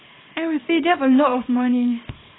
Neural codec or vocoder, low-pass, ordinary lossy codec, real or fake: codec, 24 kHz, 0.9 kbps, WavTokenizer, small release; 7.2 kHz; AAC, 16 kbps; fake